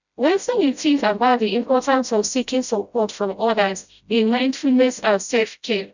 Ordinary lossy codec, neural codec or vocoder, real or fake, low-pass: none; codec, 16 kHz, 0.5 kbps, FreqCodec, smaller model; fake; 7.2 kHz